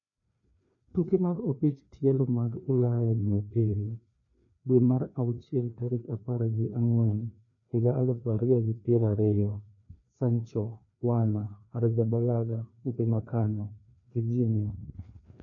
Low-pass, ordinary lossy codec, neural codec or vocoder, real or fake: 7.2 kHz; none; codec, 16 kHz, 2 kbps, FreqCodec, larger model; fake